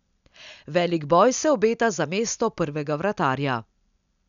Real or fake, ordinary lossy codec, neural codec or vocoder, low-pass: real; none; none; 7.2 kHz